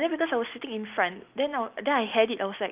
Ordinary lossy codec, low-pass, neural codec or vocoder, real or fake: Opus, 24 kbps; 3.6 kHz; none; real